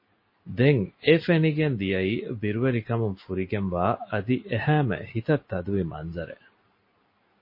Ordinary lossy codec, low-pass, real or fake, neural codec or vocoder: MP3, 24 kbps; 5.4 kHz; real; none